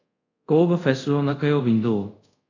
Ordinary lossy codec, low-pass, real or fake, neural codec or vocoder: AAC, 48 kbps; 7.2 kHz; fake; codec, 24 kHz, 0.5 kbps, DualCodec